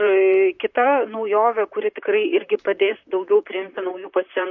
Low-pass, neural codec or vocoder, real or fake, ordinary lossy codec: 7.2 kHz; vocoder, 44.1 kHz, 128 mel bands, Pupu-Vocoder; fake; MP3, 48 kbps